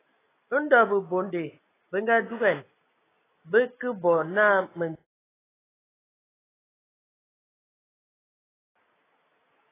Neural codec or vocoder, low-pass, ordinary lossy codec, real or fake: none; 3.6 kHz; AAC, 16 kbps; real